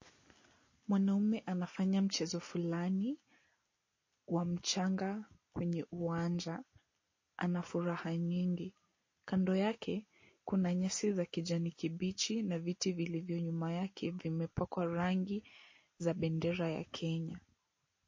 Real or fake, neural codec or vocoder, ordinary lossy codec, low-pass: real; none; MP3, 32 kbps; 7.2 kHz